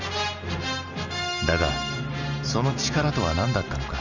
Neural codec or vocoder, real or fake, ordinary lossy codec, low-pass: none; real; Opus, 64 kbps; 7.2 kHz